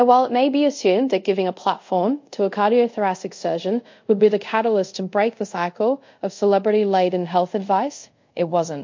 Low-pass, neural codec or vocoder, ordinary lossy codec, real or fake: 7.2 kHz; codec, 24 kHz, 0.5 kbps, DualCodec; MP3, 48 kbps; fake